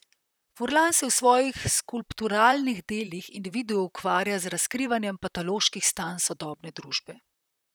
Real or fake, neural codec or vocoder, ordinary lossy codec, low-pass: fake; vocoder, 44.1 kHz, 128 mel bands, Pupu-Vocoder; none; none